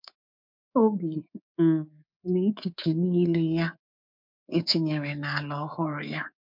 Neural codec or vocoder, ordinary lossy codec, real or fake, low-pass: codec, 24 kHz, 3.1 kbps, DualCodec; MP3, 48 kbps; fake; 5.4 kHz